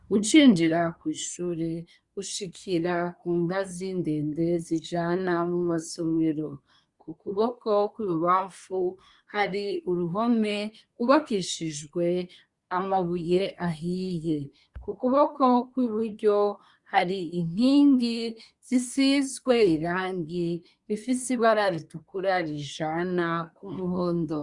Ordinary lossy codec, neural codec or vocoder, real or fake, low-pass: Opus, 64 kbps; codec, 24 kHz, 1 kbps, SNAC; fake; 10.8 kHz